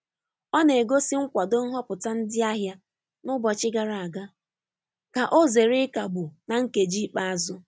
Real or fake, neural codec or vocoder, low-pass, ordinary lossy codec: real; none; none; none